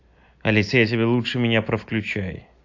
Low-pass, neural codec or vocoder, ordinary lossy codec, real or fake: 7.2 kHz; none; AAC, 48 kbps; real